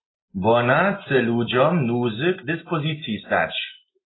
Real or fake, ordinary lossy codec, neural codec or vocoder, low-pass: real; AAC, 16 kbps; none; 7.2 kHz